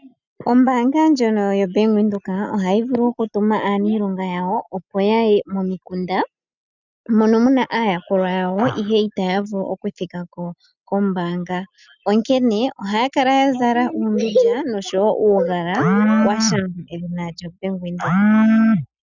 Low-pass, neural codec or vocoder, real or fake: 7.2 kHz; none; real